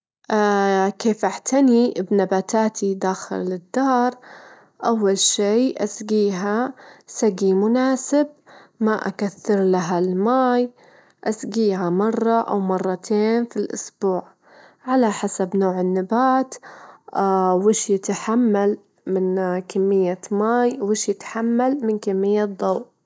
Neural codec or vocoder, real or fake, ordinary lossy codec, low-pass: none; real; none; none